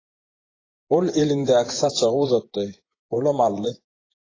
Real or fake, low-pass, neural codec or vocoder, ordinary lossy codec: fake; 7.2 kHz; vocoder, 24 kHz, 100 mel bands, Vocos; AAC, 32 kbps